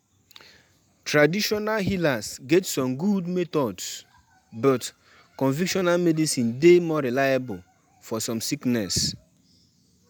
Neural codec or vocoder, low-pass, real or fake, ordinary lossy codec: none; none; real; none